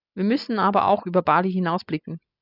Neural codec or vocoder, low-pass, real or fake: none; 5.4 kHz; real